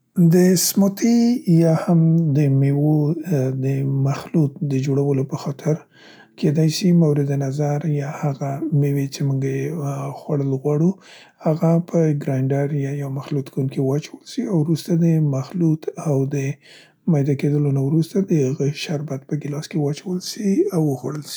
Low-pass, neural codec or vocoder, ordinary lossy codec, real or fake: none; none; none; real